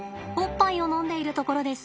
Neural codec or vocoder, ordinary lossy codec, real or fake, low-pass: none; none; real; none